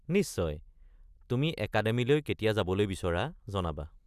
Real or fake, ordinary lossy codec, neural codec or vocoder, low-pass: real; none; none; 14.4 kHz